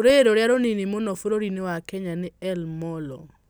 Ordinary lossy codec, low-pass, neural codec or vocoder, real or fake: none; none; none; real